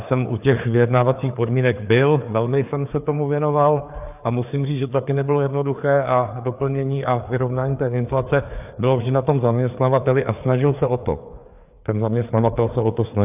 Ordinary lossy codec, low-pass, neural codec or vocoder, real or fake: AAC, 32 kbps; 3.6 kHz; codec, 16 kHz, 4 kbps, FreqCodec, larger model; fake